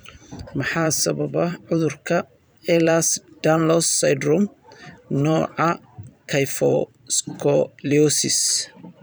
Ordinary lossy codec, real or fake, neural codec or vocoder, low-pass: none; fake; vocoder, 44.1 kHz, 128 mel bands every 512 samples, BigVGAN v2; none